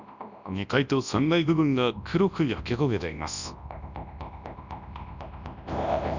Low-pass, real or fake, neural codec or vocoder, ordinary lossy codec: 7.2 kHz; fake; codec, 24 kHz, 0.9 kbps, WavTokenizer, large speech release; none